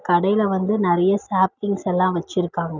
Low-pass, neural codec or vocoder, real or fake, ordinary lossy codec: 7.2 kHz; none; real; none